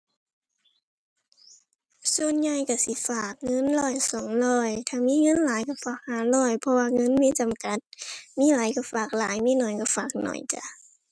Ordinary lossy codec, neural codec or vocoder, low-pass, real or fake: none; none; none; real